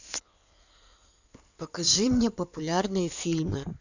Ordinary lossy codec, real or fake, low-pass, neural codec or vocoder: none; fake; 7.2 kHz; codec, 16 kHz in and 24 kHz out, 2.2 kbps, FireRedTTS-2 codec